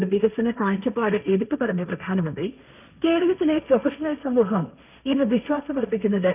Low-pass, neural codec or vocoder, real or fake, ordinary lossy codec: 3.6 kHz; codec, 16 kHz, 1.1 kbps, Voila-Tokenizer; fake; none